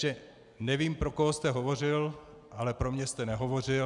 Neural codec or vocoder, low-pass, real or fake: none; 10.8 kHz; real